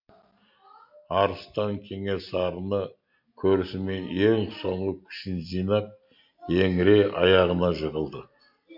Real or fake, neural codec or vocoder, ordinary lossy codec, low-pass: real; none; MP3, 48 kbps; 5.4 kHz